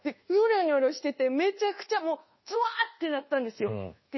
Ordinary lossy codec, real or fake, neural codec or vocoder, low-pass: MP3, 24 kbps; fake; codec, 24 kHz, 1.2 kbps, DualCodec; 7.2 kHz